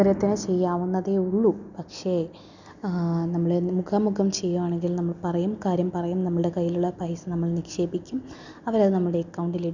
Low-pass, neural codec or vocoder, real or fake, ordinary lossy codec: 7.2 kHz; none; real; none